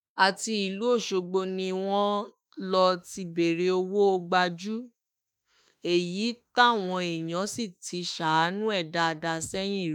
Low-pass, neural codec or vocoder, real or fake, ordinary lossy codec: 19.8 kHz; autoencoder, 48 kHz, 32 numbers a frame, DAC-VAE, trained on Japanese speech; fake; none